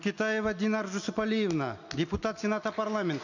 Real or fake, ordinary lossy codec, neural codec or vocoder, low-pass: real; none; none; 7.2 kHz